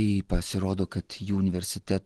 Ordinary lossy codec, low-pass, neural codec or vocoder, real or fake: Opus, 16 kbps; 10.8 kHz; none; real